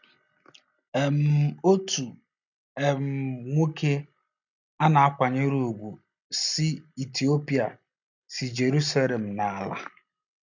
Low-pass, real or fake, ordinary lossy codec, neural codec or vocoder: 7.2 kHz; real; none; none